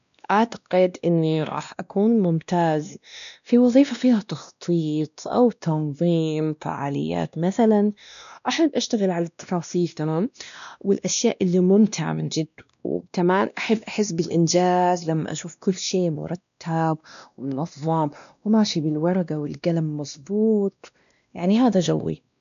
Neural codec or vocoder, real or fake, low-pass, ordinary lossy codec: codec, 16 kHz, 1 kbps, X-Codec, WavLM features, trained on Multilingual LibriSpeech; fake; 7.2 kHz; none